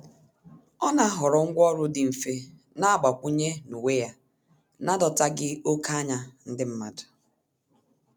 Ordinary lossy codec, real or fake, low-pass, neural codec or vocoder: none; real; none; none